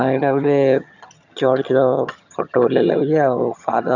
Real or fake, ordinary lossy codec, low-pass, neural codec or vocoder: fake; none; 7.2 kHz; vocoder, 22.05 kHz, 80 mel bands, HiFi-GAN